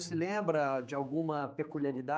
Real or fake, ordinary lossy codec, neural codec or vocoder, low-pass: fake; none; codec, 16 kHz, 4 kbps, X-Codec, HuBERT features, trained on general audio; none